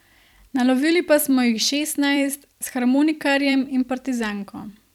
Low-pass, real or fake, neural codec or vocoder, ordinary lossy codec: 19.8 kHz; fake; vocoder, 44.1 kHz, 128 mel bands every 256 samples, BigVGAN v2; none